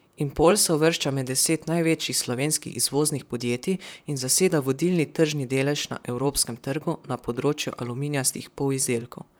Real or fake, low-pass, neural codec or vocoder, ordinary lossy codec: fake; none; vocoder, 44.1 kHz, 128 mel bands, Pupu-Vocoder; none